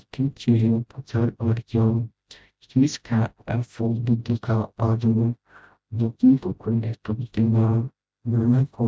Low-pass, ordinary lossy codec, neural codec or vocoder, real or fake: none; none; codec, 16 kHz, 0.5 kbps, FreqCodec, smaller model; fake